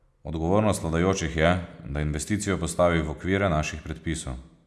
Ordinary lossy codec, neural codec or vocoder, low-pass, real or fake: none; none; none; real